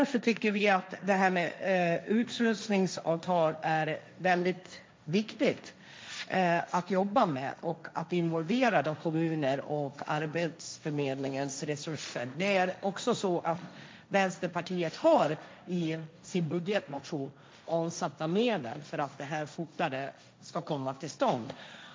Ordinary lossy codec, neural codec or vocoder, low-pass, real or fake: none; codec, 16 kHz, 1.1 kbps, Voila-Tokenizer; none; fake